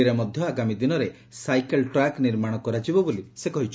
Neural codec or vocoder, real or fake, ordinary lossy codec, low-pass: none; real; none; none